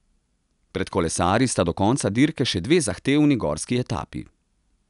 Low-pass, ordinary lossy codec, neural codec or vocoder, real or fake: 10.8 kHz; none; none; real